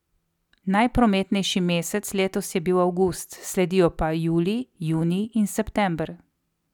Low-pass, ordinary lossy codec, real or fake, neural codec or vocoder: 19.8 kHz; none; real; none